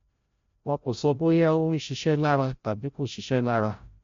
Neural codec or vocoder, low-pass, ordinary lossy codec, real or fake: codec, 16 kHz, 0.5 kbps, FreqCodec, larger model; 7.2 kHz; MP3, 48 kbps; fake